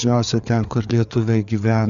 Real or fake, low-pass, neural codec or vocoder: fake; 7.2 kHz; codec, 16 kHz, 4 kbps, FreqCodec, larger model